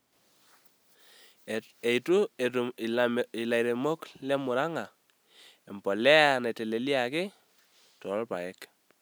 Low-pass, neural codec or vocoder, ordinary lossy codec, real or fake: none; none; none; real